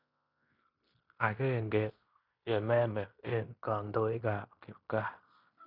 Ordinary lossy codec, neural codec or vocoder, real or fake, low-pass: none; codec, 16 kHz in and 24 kHz out, 0.9 kbps, LongCat-Audio-Codec, fine tuned four codebook decoder; fake; 5.4 kHz